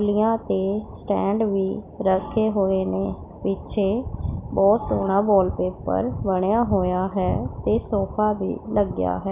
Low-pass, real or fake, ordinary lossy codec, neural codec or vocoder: 3.6 kHz; real; none; none